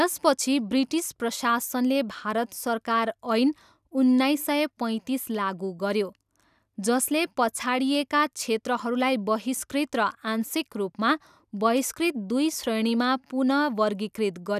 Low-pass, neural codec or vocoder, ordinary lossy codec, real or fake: 14.4 kHz; none; none; real